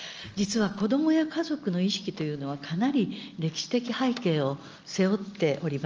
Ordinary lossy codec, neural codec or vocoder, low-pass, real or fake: Opus, 24 kbps; none; 7.2 kHz; real